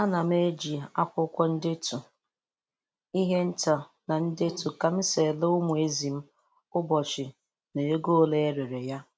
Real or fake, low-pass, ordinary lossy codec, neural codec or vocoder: real; none; none; none